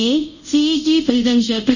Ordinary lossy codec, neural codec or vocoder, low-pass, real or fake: none; codec, 24 kHz, 0.5 kbps, DualCodec; 7.2 kHz; fake